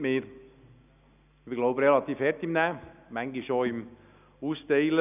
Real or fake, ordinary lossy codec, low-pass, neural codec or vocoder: real; none; 3.6 kHz; none